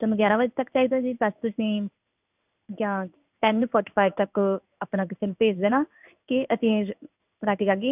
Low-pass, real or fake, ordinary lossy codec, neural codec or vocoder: 3.6 kHz; fake; none; codec, 16 kHz in and 24 kHz out, 1 kbps, XY-Tokenizer